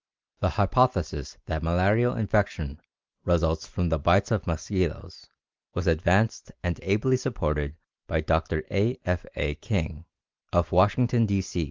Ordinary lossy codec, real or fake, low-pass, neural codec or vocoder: Opus, 24 kbps; real; 7.2 kHz; none